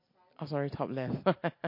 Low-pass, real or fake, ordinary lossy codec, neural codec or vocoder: 5.4 kHz; real; MP3, 32 kbps; none